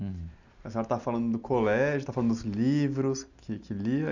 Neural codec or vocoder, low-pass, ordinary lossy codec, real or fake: none; 7.2 kHz; none; real